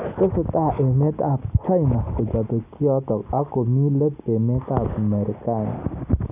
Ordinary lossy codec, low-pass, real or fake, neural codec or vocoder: none; 3.6 kHz; real; none